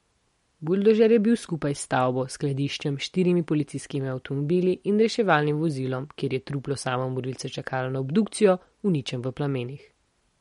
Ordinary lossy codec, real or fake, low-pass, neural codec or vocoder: MP3, 48 kbps; real; 19.8 kHz; none